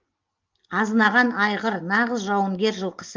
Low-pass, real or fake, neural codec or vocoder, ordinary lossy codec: 7.2 kHz; real; none; Opus, 24 kbps